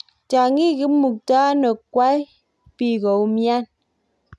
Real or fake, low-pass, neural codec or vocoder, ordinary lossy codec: real; none; none; none